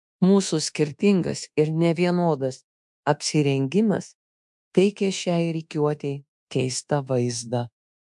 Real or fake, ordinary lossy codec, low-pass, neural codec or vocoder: fake; MP3, 64 kbps; 10.8 kHz; codec, 24 kHz, 1.2 kbps, DualCodec